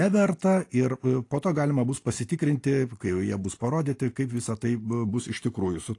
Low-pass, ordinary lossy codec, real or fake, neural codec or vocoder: 10.8 kHz; AAC, 48 kbps; real; none